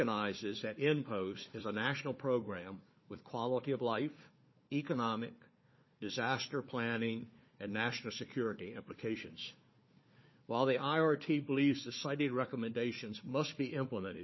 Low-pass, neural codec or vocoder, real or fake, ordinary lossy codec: 7.2 kHz; codec, 16 kHz, 4 kbps, FunCodec, trained on Chinese and English, 50 frames a second; fake; MP3, 24 kbps